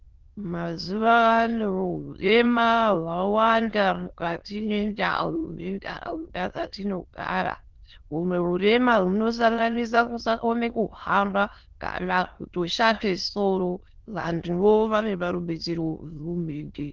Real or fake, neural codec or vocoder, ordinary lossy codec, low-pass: fake; autoencoder, 22.05 kHz, a latent of 192 numbers a frame, VITS, trained on many speakers; Opus, 16 kbps; 7.2 kHz